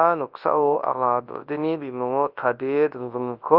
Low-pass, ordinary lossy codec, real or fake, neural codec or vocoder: 5.4 kHz; Opus, 32 kbps; fake; codec, 24 kHz, 0.9 kbps, WavTokenizer, large speech release